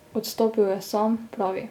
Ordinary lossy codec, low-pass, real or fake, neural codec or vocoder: none; 19.8 kHz; real; none